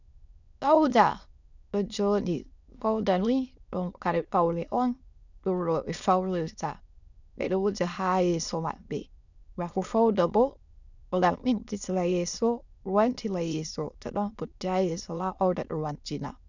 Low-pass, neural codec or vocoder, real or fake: 7.2 kHz; autoencoder, 22.05 kHz, a latent of 192 numbers a frame, VITS, trained on many speakers; fake